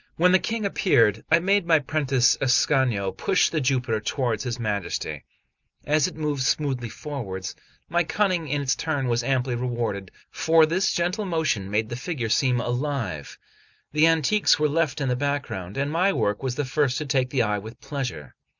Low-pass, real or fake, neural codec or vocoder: 7.2 kHz; real; none